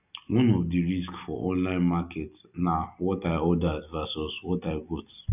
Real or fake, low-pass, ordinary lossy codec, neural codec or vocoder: real; 3.6 kHz; none; none